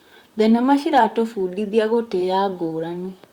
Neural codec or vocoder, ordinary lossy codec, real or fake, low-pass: vocoder, 44.1 kHz, 128 mel bands, Pupu-Vocoder; Opus, 64 kbps; fake; 19.8 kHz